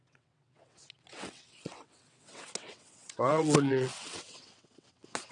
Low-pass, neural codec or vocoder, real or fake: 9.9 kHz; vocoder, 22.05 kHz, 80 mel bands, WaveNeXt; fake